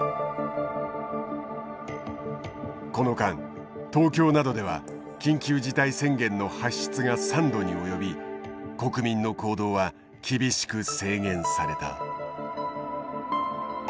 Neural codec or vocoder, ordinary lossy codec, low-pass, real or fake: none; none; none; real